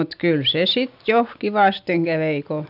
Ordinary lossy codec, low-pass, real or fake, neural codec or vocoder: none; 5.4 kHz; real; none